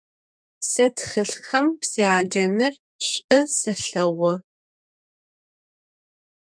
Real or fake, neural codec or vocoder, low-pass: fake; codec, 32 kHz, 1.9 kbps, SNAC; 9.9 kHz